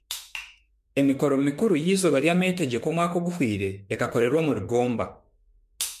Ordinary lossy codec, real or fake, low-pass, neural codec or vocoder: MP3, 64 kbps; fake; 14.4 kHz; autoencoder, 48 kHz, 32 numbers a frame, DAC-VAE, trained on Japanese speech